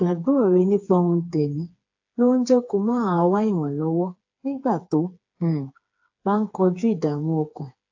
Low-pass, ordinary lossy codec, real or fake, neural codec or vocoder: 7.2 kHz; AAC, 48 kbps; fake; codec, 16 kHz, 4 kbps, FreqCodec, smaller model